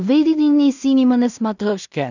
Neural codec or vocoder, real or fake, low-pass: codec, 16 kHz in and 24 kHz out, 0.4 kbps, LongCat-Audio-Codec, two codebook decoder; fake; 7.2 kHz